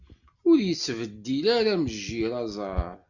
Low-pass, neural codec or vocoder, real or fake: 7.2 kHz; none; real